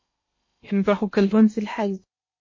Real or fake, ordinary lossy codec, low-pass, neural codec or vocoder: fake; MP3, 32 kbps; 7.2 kHz; codec, 16 kHz in and 24 kHz out, 0.8 kbps, FocalCodec, streaming, 65536 codes